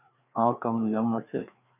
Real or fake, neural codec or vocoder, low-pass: fake; codec, 16 kHz, 2 kbps, FreqCodec, larger model; 3.6 kHz